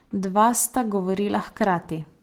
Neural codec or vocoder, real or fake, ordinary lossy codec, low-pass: none; real; Opus, 16 kbps; 19.8 kHz